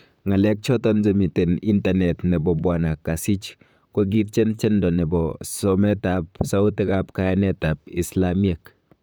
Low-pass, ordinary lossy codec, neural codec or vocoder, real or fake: none; none; vocoder, 44.1 kHz, 128 mel bands, Pupu-Vocoder; fake